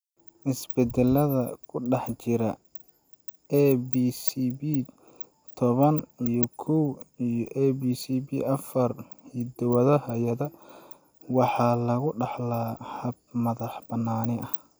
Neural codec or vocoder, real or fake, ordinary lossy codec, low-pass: none; real; none; none